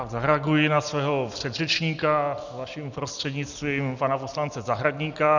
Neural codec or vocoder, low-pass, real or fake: none; 7.2 kHz; real